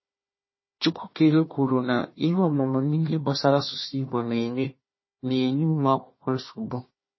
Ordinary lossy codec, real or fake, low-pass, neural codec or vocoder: MP3, 24 kbps; fake; 7.2 kHz; codec, 16 kHz, 1 kbps, FunCodec, trained on Chinese and English, 50 frames a second